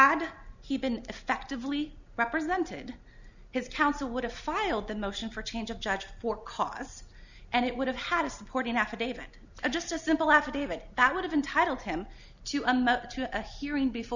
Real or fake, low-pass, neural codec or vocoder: real; 7.2 kHz; none